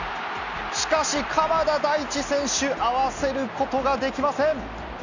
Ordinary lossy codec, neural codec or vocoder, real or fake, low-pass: none; none; real; 7.2 kHz